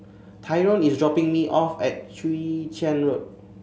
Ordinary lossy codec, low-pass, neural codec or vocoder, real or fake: none; none; none; real